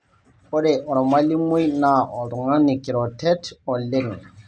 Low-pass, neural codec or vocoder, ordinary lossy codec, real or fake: none; none; none; real